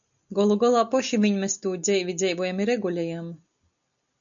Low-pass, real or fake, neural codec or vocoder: 7.2 kHz; real; none